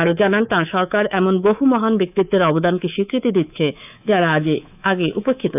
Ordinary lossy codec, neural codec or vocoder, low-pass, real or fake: none; codec, 24 kHz, 3.1 kbps, DualCodec; 3.6 kHz; fake